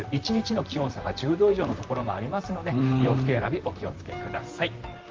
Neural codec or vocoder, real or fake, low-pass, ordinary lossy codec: vocoder, 44.1 kHz, 128 mel bands, Pupu-Vocoder; fake; 7.2 kHz; Opus, 32 kbps